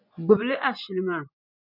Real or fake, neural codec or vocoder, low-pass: fake; vocoder, 24 kHz, 100 mel bands, Vocos; 5.4 kHz